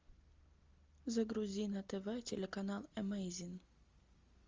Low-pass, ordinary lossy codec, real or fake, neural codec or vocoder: 7.2 kHz; Opus, 32 kbps; real; none